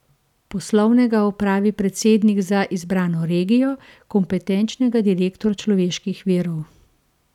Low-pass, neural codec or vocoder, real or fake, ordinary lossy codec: 19.8 kHz; none; real; none